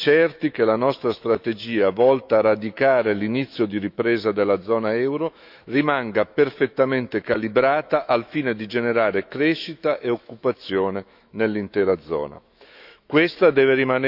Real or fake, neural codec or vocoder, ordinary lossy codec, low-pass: fake; autoencoder, 48 kHz, 128 numbers a frame, DAC-VAE, trained on Japanese speech; none; 5.4 kHz